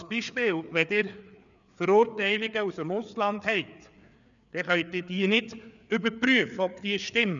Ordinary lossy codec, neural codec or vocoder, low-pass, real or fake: none; codec, 16 kHz, 4 kbps, FreqCodec, larger model; 7.2 kHz; fake